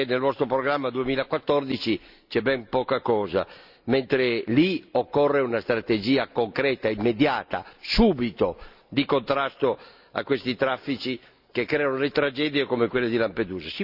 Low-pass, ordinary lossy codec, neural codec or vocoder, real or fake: 5.4 kHz; none; none; real